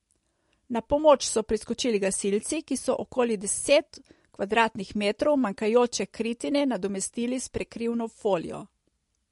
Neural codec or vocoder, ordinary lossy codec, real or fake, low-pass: none; MP3, 48 kbps; real; 14.4 kHz